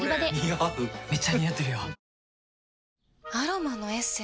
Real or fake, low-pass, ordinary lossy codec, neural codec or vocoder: real; none; none; none